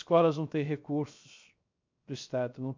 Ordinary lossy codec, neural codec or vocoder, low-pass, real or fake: MP3, 48 kbps; codec, 16 kHz, 0.7 kbps, FocalCodec; 7.2 kHz; fake